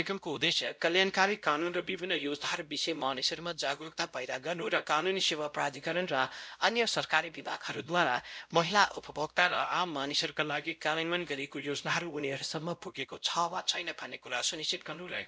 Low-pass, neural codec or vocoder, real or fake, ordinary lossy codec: none; codec, 16 kHz, 0.5 kbps, X-Codec, WavLM features, trained on Multilingual LibriSpeech; fake; none